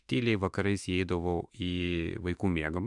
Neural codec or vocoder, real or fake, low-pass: autoencoder, 48 kHz, 32 numbers a frame, DAC-VAE, trained on Japanese speech; fake; 10.8 kHz